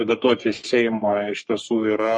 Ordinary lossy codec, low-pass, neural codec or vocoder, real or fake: MP3, 48 kbps; 10.8 kHz; codec, 44.1 kHz, 3.4 kbps, Pupu-Codec; fake